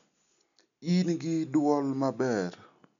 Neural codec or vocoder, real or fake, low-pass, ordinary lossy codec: none; real; 7.2 kHz; none